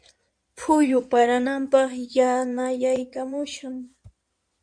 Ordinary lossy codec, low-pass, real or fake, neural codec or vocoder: MP3, 64 kbps; 9.9 kHz; fake; codec, 16 kHz in and 24 kHz out, 2.2 kbps, FireRedTTS-2 codec